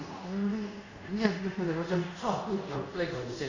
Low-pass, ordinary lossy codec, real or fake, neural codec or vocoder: 7.2 kHz; none; fake; codec, 24 kHz, 0.5 kbps, DualCodec